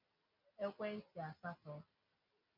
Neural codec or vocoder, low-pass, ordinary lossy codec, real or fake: none; 5.4 kHz; MP3, 48 kbps; real